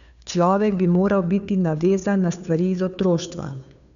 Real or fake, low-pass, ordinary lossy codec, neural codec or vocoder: fake; 7.2 kHz; none; codec, 16 kHz, 2 kbps, FunCodec, trained on Chinese and English, 25 frames a second